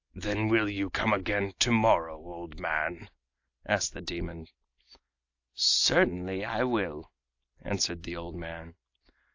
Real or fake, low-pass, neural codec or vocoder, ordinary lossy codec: real; 7.2 kHz; none; AAC, 48 kbps